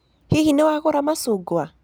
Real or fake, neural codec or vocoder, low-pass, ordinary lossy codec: real; none; none; none